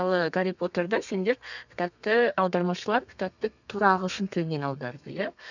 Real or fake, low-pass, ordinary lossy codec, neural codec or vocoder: fake; 7.2 kHz; none; codec, 32 kHz, 1.9 kbps, SNAC